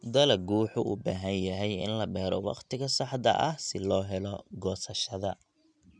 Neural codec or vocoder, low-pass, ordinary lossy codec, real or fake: none; 9.9 kHz; none; real